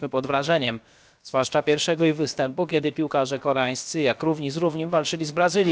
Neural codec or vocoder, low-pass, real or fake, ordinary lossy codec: codec, 16 kHz, about 1 kbps, DyCAST, with the encoder's durations; none; fake; none